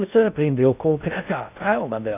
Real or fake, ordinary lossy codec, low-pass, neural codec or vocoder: fake; none; 3.6 kHz; codec, 16 kHz in and 24 kHz out, 0.6 kbps, FocalCodec, streaming, 4096 codes